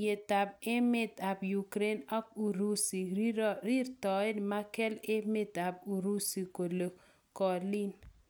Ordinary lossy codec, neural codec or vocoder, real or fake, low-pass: none; none; real; none